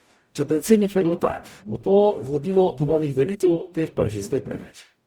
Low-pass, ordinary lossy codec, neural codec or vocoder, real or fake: 19.8 kHz; Opus, 64 kbps; codec, 44.1 kHz, 0.9 kbps, DAC; fake